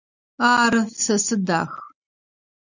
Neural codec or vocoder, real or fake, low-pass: none; real; 7.2 kHz